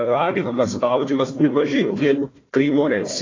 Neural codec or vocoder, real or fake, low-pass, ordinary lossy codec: codec, 16 kHz, 1 kbps, FunCodec, trained on Chinese and English, 50 frames a second; fake; 7.2 kHz; AAC, 32 kbps